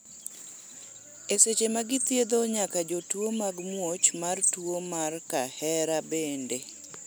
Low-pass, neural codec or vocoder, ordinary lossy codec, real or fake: none; none; none; real